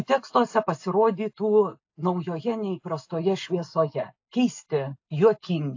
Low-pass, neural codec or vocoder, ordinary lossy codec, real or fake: 7.2 kHz; none; AAC, 48 kbps; real